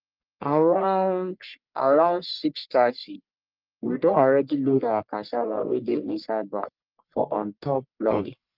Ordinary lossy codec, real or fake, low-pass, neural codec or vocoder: Opus, 24 kbps; fake; 5.4 kHz; codec, 44.1 kHz, 1.7 kbps, Pupu-Codec